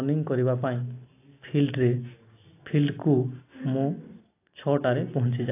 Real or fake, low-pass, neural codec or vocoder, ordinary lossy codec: real; 3.6 kHz; none; AAC, 24 kbps